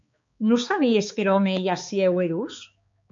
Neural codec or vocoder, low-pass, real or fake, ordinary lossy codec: codec, 16 kHz, 4 kbps, X-Codec, HuBERT features, trained on balanced general audio; 7.2 kHz; fake; MP3, 48 kbps